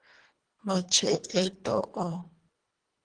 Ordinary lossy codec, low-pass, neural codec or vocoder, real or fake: Opus, 24 kbps; 9.9 kHz; codec, 24 kHz, 1.5 kbps, HILCodec; fake